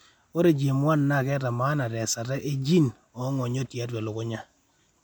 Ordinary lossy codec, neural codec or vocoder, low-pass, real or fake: MP3, 96 kbps; none; 19.8 kHz; real